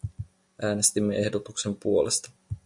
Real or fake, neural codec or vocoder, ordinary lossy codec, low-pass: real; none; MP3, 64 kbps; 10.8 kHz